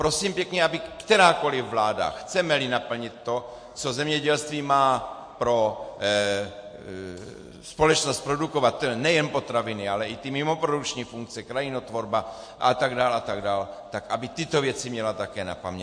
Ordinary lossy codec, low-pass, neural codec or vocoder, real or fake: MP3, 48 kbps; 9.9 kHz; none; real